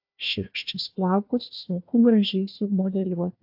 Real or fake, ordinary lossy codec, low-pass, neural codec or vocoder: fake; AAC, 48 kbps; 5.4 kHz; codec, 16 kHz, 1 kbps, FunCodec, trained on Chinese and English, 50 frames a second